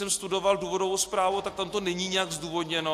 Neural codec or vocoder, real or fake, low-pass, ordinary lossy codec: none; real; 14.4 kHz; AAC, 64 kbps